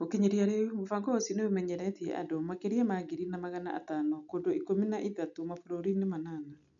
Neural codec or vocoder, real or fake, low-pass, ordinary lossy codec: none; real; 7.2 kHz; none